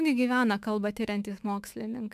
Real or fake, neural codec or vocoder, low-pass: fake; autoencoder, 48 kHz, 128 numbers a frame, DAC-VAE, trained on Japanese speech; 14.4 kHz